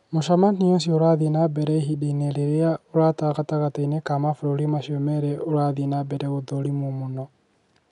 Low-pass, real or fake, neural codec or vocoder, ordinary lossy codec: 10.8 kHz; real; none; none